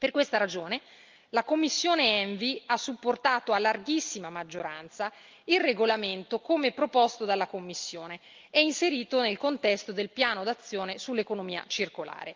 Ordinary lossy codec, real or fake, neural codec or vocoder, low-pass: Opus, 24 kbps; real; none; 7.2 kHz